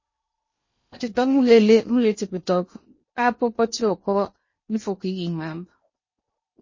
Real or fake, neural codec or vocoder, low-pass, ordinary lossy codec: fake; codec, 16 kHz in and 24 kHz out, 0.8 kbps, FocalCodec, streaming, 65536 codes; 7.2 kHz; MP3, 32 kbps